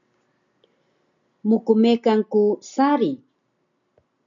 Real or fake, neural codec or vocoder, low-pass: real; none; 7.2 kHz